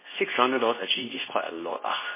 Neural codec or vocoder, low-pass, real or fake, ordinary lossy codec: codec, 24 kHz, 0.9 kbps, WavTokenizer, medium speech release version 2; 3.6 kHz; fake; MP3, 16 kbps